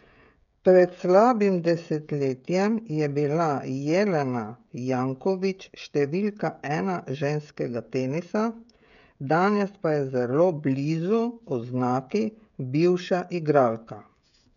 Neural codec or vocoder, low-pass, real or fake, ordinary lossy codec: codec, 16 kHz, 16 kbps, FreqCodec, smaller model; 7.2 kHz; fake; none